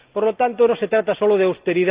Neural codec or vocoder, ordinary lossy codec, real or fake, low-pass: none; Opus, 32 kbps; real; 3.6 kHz